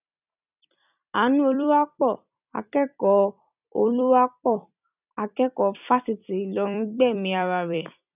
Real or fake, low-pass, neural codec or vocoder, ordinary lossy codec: fake; 3.6 kHz; vocoder, 44.1 kHz, 128 mel bands every 256 samples, BigVGAN v2; none